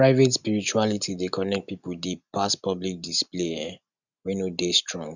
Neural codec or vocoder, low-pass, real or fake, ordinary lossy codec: none; 7.2 kHz; real; none